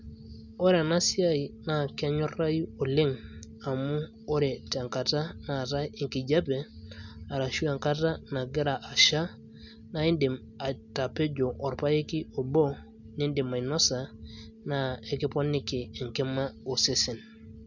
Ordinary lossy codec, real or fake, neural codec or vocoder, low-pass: none; real; none; 7.2 kHz